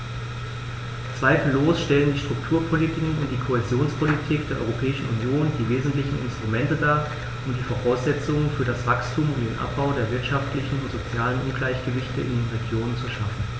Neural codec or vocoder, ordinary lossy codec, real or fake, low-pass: none; none; real; none